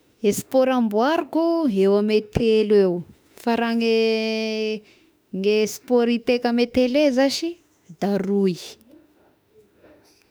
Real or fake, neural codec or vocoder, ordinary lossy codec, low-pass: fake; autoencoder, 48 kHz, 32 numbers a frame, DAC-VAE, trained on Japanese speech; none; none